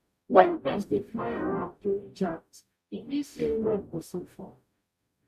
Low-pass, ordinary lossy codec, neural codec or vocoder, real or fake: 14.4 kHz; none; codec, 44.1 kHz, 0.9 kbps, DAC; fake